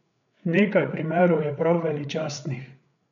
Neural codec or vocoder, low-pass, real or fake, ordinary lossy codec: codec, 16 kHz, 8 kbps, FreqCodec, larger model; 7.2 kHz; fake; none